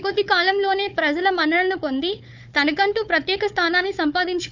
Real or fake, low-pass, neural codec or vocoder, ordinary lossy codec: fake; 7.2 kHz; codec, 16 kHz, 4 kbps, FunCodec, trained on Chinese and English, 50 frames a second; none